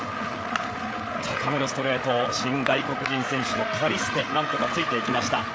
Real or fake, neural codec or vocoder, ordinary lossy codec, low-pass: fake; codec, 16 kHz, 8 kbps, FreqCodec, larger model; none; none